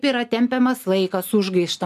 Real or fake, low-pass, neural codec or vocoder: real; 14.4 kHz; none